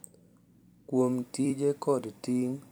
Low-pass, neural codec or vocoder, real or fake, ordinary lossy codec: none; vocoder, 44.1 kHz, 128 mel bands every 256 samples, BigVGAN v2; fake; none